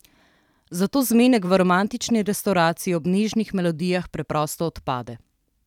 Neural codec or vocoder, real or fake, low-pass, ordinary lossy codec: vocoder, 44.1 kHz, 128 mel bands every 512 samples, BigVGAN v2; fake; 19.8 kHz; none